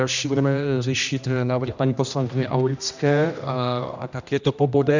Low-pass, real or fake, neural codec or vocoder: 7.2 kHz; fake; codec, 16 kHz, 1 kbps, X-Codec, HuBERT features, trained on general audio